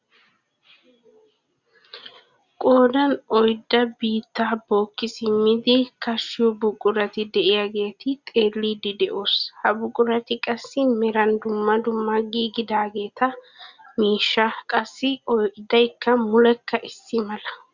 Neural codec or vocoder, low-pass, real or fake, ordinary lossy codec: none; 7.2 kHz; real; Opus, 64 kbps